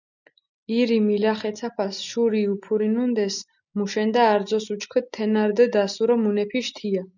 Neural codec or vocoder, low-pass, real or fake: none; 7.2 kHz; real